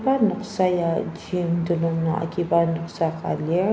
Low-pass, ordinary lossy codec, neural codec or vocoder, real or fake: none; none; none; real